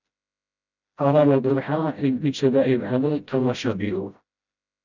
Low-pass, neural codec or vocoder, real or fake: 7.2 kHz; codec, 16 kHz, 0.5 kbps, FreqCodec, smaller model; fake